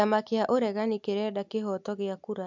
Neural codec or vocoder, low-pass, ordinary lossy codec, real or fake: none; 7.2 kHz; MP3, 64 kbps; real